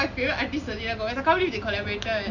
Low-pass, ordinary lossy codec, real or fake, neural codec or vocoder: 7.2 kHz; none; real; none